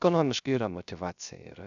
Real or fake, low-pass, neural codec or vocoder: fake; 7.2 kHz; codec, 16 kHz, 0.3 kbps, FocalCodec